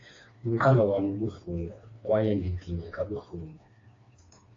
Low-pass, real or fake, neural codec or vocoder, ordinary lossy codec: 7.2 kHz; fake; codec, 16 kHz, 2 kbps, FreqCodec, smaller model; AAC, 32 kbps